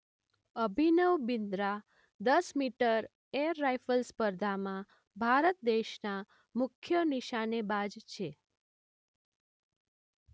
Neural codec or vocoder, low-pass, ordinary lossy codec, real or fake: none; none; none; real